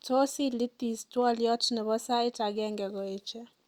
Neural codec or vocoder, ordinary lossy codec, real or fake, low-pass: none; Opus, 64 kbps; real; 19.8 kHz